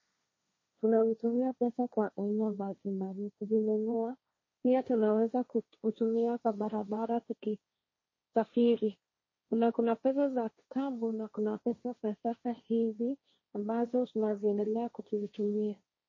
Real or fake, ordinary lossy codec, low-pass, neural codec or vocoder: fake; MP3, 32 kbps; 7.2 kHz; codec, 16 kHz, 1.1 kbps, Voila-Tokenizer